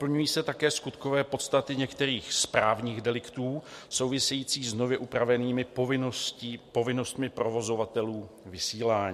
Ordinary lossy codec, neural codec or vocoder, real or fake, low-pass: MP3, 64 kbps; none; real; 14.4 kHz